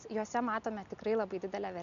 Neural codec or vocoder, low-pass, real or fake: none; 7.2 kHz; real